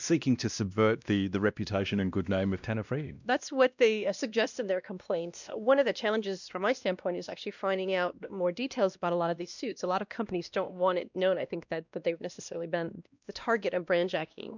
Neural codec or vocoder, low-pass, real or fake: codec, 16 kHz, 1 kbps, X-Codec, WavLM features, trained on Multilingual LibriSpeech; 7.2 kHz; fake